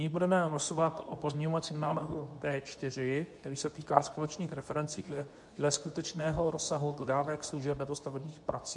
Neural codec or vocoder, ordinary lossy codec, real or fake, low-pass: codec, 24 kHz, 0.9 kbps, WavTokenizer, medium speech release version 2; MP3, 64 kbps; fake; 10.8 kHz